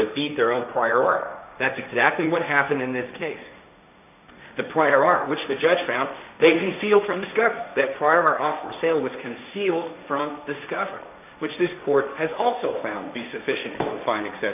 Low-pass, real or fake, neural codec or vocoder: 3.6 kHz; fake; codec, 16 kHz, 1.1 kbps, Voila-Tokenizer